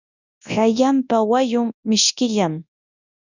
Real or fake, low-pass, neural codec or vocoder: fake; 7.2 kHz; codec, 24 kHz, 0.9 kbps, WavTokenizer, large speech release